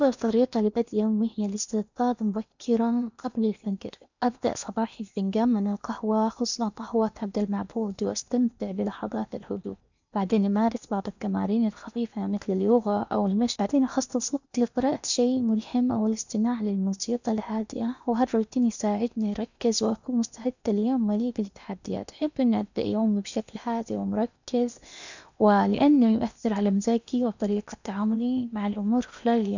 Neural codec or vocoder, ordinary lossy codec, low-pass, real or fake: codec, 16 kHz in and 24 kHz out, 0.8 kbps, FocalCodec, streaming, 65536 codes; none; 7.2 kHz; fake